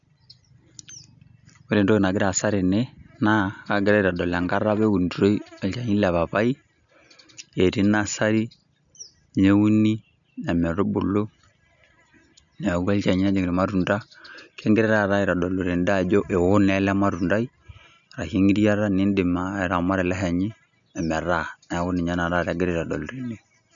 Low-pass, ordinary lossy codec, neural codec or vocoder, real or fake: 7.2 kHz; none; none; real